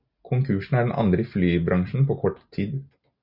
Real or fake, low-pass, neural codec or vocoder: real; 5.4 kHz; none